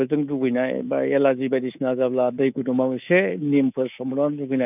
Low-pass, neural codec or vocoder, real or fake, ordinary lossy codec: 3.6 kHz; codec, 24 kHz, 3.1 kbps, DualCodec; fake; none